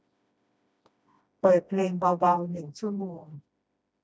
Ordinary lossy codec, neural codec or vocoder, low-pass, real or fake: none; codec, 16 kHz, 1 kbps, FreqCodec, smaller model; none; fake